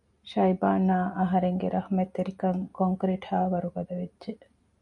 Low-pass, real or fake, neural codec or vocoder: 10.8 kHz; real; none